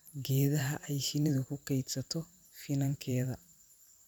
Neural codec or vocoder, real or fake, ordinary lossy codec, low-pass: vocoder, 44.1 kHz, 128 mel bands every 512 samples, BigVGAN v2; fake; none; none